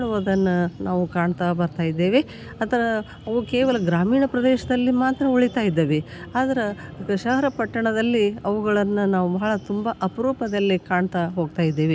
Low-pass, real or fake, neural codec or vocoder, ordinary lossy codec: none; real; none; none